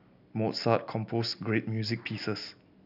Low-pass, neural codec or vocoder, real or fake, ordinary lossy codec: 5.4 kHz; none; real; none